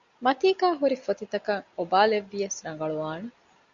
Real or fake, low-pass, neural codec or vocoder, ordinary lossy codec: real; 7.2 kHz; none; Opus, 64 kbps